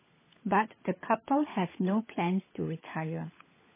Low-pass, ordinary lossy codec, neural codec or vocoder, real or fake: 3.6 kHz; MP3, 16 kbps; codec, 16 kHz, 16 kbps, FunCodec, trained on LibriTTS, 50 frames a second; fake